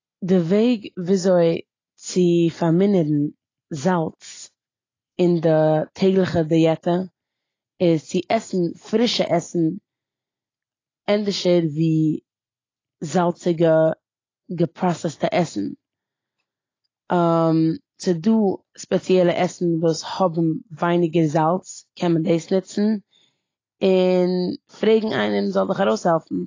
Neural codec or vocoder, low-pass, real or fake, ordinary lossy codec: none; 7.2 kHz; real; AAC, 32 kbps